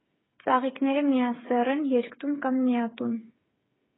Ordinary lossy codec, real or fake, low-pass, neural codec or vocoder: AAC, 16 kbps; fake; 7.2 kHz; codec, 16 kHz, 8 kbps, FreqCodec, smaller model